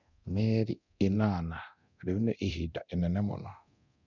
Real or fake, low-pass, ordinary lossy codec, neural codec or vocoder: fake; 7.2 kHz; none; codec, 24 kHz, 0.9 kbps, DualCodec